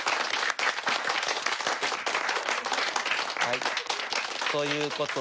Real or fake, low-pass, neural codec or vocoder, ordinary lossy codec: real; none; none; none